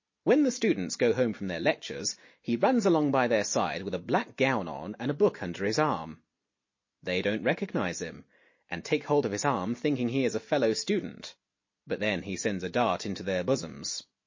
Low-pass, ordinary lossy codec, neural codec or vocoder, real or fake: 7.2 kHz; MP3, 32 kbps; none; real